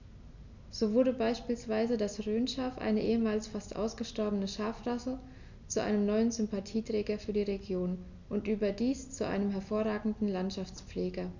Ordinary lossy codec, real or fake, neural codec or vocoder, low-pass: none; real; none; 7.2 kHz